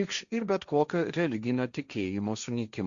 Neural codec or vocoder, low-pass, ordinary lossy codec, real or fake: codec, 16 kHz, 1.1 kbps, Voila-Tokenizer; 7.2 kHz; Opus, 64 kbps; fake